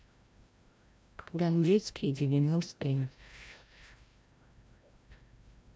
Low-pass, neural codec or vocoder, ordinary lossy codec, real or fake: none; codec, 16 kHz, 0.5 kbps, FreqCodec, larger model; none; fake